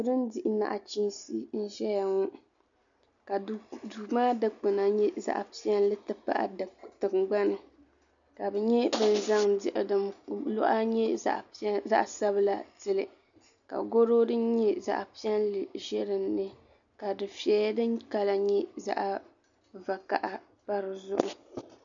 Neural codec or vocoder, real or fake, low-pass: none; real; 7.2 kHz